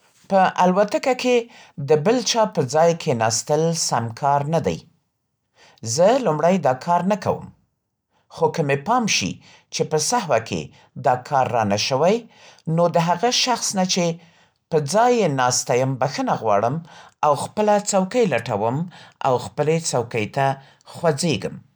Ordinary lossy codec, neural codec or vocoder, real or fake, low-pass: none; none; real; none